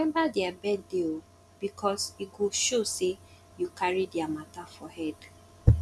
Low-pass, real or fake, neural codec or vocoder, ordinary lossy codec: none; real; none; none